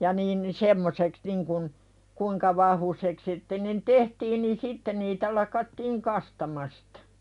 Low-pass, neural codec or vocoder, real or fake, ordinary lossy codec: 10.8 kHz; none; real; none